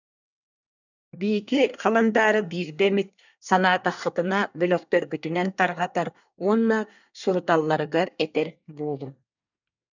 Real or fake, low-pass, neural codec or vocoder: fake; 7.2 kHz; codec, 24 kHz, 1 kbps, SNAC